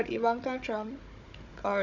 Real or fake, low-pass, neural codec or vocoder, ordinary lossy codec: fake; 7.2 kHz; codec, 16 kHz, 16 kbps, FunCodec, trained on Chinese and English, 50 frames a second; none